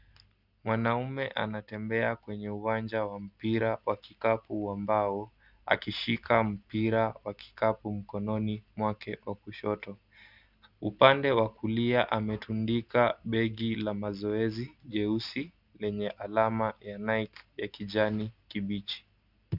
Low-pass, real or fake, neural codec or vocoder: 5.4 kHz; real; none